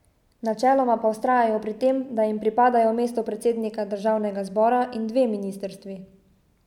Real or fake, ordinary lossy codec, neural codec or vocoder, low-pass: real; none; none; 19.8 kHz